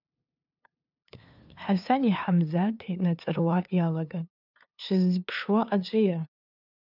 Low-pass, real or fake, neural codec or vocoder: 5.4 kHz; fake; codec, 16 kHz, 2 kbps, FunCodec, trained on LibriTTS, 25 frames a second